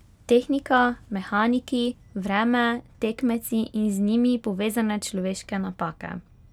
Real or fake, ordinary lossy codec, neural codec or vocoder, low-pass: real; none; none; 19.8 kHz